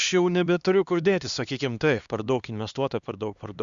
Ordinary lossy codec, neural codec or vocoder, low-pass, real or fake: Opus, 64 kbps; codec, 16 kHz, 2 kbps, X-Codec, HuBERT features, trained on LibriSpeech; 7.2 kHz; fake